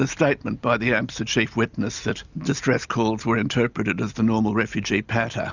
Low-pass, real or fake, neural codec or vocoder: 7.2 kHz; real; none